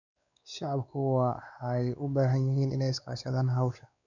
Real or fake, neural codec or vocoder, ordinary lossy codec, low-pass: fake; codec, 16 kHz, 4 kbps, X-Codec, WavLM features, trained on Multilingual LibriSpeech; none; 7.2 kHz